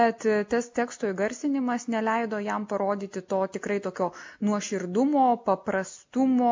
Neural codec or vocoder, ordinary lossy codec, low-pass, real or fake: none; MP3, 48 kbps; 7.2 kHz; real